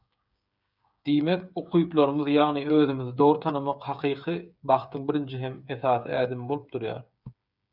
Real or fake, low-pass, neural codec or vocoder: fake; 5.4 kHz; codec, 16 kHz, 8 kbps, FreqCodec, smaller model